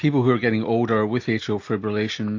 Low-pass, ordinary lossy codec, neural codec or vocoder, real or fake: 7.2 kHz; AAC, 48 kbps; none; real